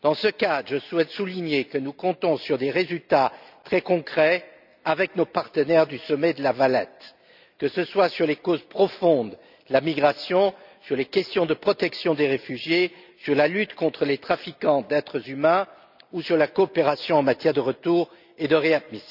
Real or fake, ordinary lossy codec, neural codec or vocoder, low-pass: real; none; none; 5.4 kHz